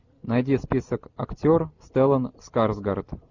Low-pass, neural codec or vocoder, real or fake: 7.2 kHz; none; real